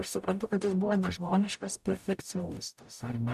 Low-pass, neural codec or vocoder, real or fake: 14.4 kHz; codec, 44.1 kHz, 0.9 kbps, DAC; fake